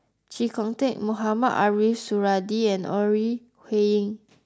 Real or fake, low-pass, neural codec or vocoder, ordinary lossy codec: real; none; none; none